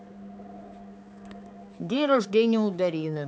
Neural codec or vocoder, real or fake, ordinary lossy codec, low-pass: codec, 16 kHz, 4 kbps, X-Codec, HuBERT features, trained on balanced general audio; fake; none; none